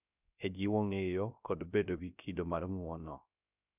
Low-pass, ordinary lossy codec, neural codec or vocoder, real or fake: 3.6 kHz; none; codec, 16 kHz, 0.3 kbps, FocalCodec; fake